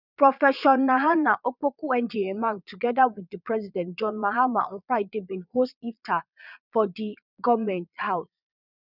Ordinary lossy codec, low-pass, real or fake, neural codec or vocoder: none; 5.4 kHz; fake; vocoder, 22.05 kHz, 80 mel bands, Vocos